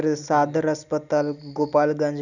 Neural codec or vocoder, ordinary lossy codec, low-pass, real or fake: none; none; 7.2 kHz; real